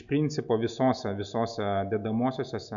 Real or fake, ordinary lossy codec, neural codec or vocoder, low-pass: real; MP3, 96 kbps; none; 7.2 kHz